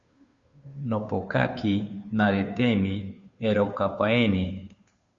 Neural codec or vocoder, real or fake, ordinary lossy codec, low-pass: codec, 16 kHz, 2 kbps, FunCodec, trained on Chinese and English, 25 frames a second; fake; Opus, 64 kbps; 7.2 kHz